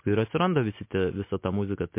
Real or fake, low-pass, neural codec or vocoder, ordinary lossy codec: real; 3.6 kHz; none; MP3, 24 kbps